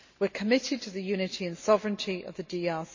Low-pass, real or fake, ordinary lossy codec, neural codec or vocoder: 7.2 kHz; real; MP3, 32 kbps; none